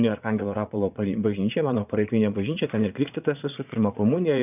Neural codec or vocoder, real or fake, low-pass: vocoder, 22.05 kHz, 80 mel bands, Vocos; fake; 3.6 kHz